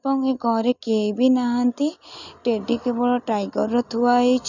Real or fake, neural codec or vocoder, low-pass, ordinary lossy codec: real; none; 7.2 kHz; none